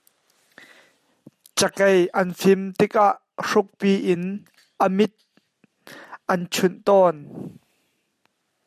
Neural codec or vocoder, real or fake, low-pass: none; real; 14.4 kHz